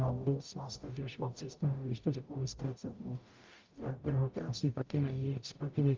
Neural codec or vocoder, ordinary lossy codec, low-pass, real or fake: codec, 44.1 kHz, 0.9 kbps, DAC; Opus, 24 kbps; 7.2 kHz; fake